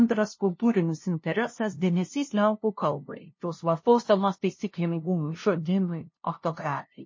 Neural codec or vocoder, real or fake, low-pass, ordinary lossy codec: codec, 16 kHz, 0.5 kbps, FunCodec, trained on LibriTTS, 25 frames a second; fake; 7.2 kHz; MP3, 32 kbps